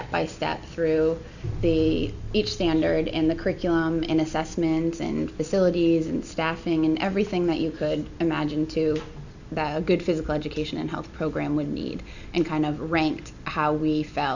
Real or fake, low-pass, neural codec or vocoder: real; 7.2 kHz; none